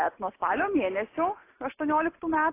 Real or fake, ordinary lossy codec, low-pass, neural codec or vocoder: real; AAC, 24 kbps; 3.6 kHz; none